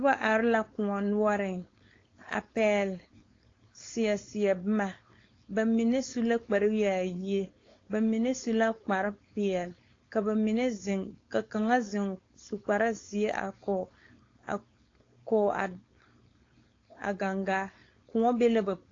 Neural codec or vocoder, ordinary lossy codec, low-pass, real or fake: codec, 16 kHz, 4.8 kbps, FACodec; AAC, 32 kbps; 7.2 kHz; fake